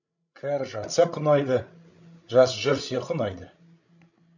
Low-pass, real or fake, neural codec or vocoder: 7.2 kHz; fake; codec, 16 kHz, 16 kbps, FreqCodec, larger model